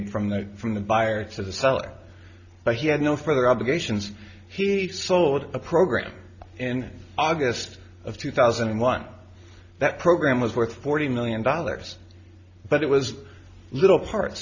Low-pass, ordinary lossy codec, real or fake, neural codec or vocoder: 7.2 kHz; Opus, 64 kbps; real; none